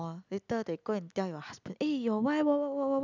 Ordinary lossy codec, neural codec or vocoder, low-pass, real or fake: none; none; 7.2 kHz; real